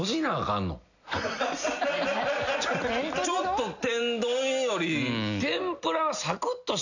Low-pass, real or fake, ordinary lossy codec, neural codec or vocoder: 7.2 kHz; real; none; none